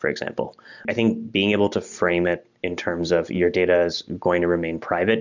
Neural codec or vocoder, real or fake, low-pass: none; real; 7.2 kHz